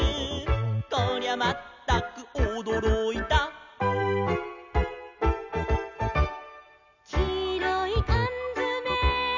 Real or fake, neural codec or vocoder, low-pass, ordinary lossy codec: real; none; 7.2 kHz; none